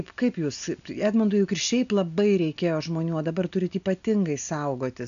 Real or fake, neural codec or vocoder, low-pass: real; none; 7.2 kHz